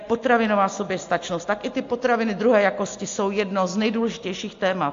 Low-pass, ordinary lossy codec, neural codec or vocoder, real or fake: 7.2 kHz; AAC, 48 kbps; none; real